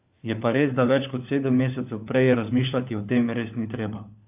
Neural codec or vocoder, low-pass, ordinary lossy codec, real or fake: codec, 16 kHz, 4 kbps, FunCodec, trained on LibriTTS, 50 frames a second; 3.6 kHz; AAC, 32 kbps; fake